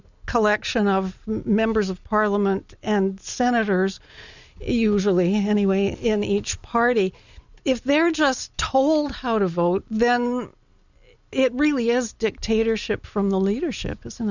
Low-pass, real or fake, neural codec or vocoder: 7.2 kHz; real; none